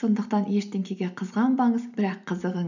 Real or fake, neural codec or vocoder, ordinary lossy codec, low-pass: real; none; none; 7.2 kHz